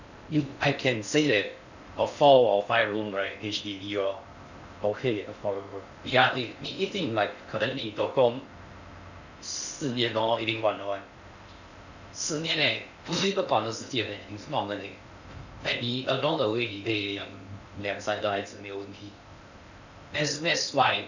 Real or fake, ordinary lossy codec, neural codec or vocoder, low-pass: fake; none; codec, 16 kHz in and 24 kHz out, 0.6 kbps, FocalCodec, streaming, 4096 codes; 7.2 kHz